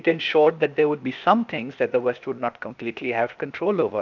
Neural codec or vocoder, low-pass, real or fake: codec, 16 kHz, 0.8 kbps, ZipCodec; 7.2 kHz; fake